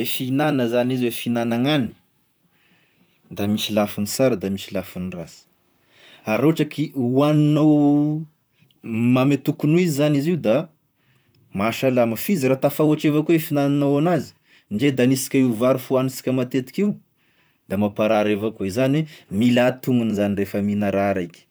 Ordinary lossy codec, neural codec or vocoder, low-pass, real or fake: none; vocoder, 44.1 kHz, 128 mel bands, Pupu-Vocoder; none; fake